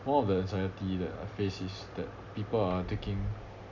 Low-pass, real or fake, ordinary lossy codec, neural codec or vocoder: 7.2 kHz; real; none; none